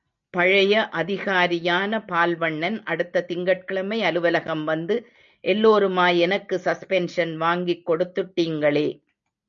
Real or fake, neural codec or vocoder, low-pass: real; none; 7.2 kHz